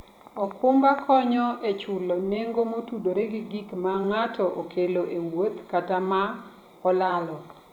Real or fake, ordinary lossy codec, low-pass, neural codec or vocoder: fake; none; 19.8 kHz; vocoder, 44.1 kHz, 128 mel bands every 512 samples, BigVGAN v2